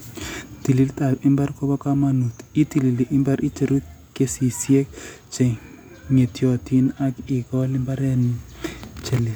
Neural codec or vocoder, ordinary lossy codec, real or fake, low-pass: none; none; real; none